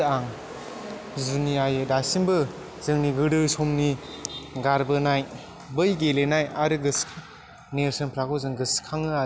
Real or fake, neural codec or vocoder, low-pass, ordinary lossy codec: real; none; none; none